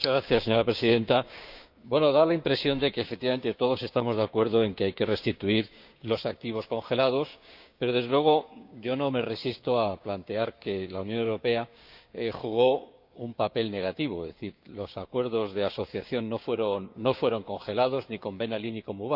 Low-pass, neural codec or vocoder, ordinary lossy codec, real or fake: 5.4 kHz; codec, 16 kHz, 6 kbps, DAC; none; fake